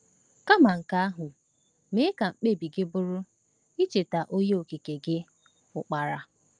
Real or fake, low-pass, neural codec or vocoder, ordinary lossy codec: real; 9.9 kHz; none; Opus, 32 kbps